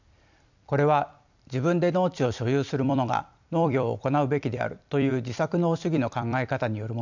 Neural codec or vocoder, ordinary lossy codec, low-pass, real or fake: vocoder, 44.1 kHz, 128 mel bands every 256 samples, BigVGAN v2; none; 7.2 kHz; fake